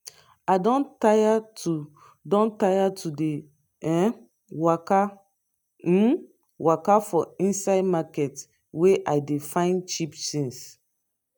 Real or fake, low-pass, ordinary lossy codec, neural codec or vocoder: real; none; none; none